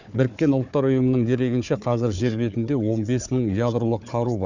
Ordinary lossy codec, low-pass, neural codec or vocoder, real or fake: none; 7.2 kHz; codec, 16 kHz, 4 kbps, FunCodec, trained on Chinese and English, 50 frames a second; fake